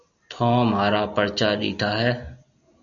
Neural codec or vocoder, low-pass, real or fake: none; 7.2 kHz; real